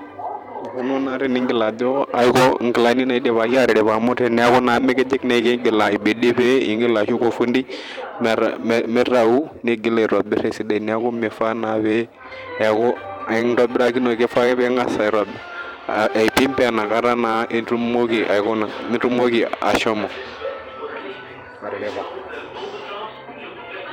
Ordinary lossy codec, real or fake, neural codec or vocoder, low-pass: none; fake; vocoder, 44.1 kHz, 128 mel bands every 512 samples, BigVGAN v2; 19.8 kHz